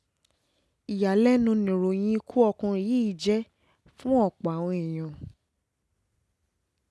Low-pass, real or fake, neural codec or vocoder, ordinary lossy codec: none; real; none; none